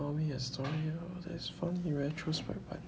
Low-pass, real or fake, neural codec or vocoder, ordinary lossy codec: none; real; none; none